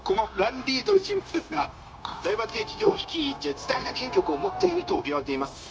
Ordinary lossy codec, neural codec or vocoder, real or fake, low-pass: none; codec, 16 kHz, 0.9 kbps, LongCat-Audio-Codec; fake; none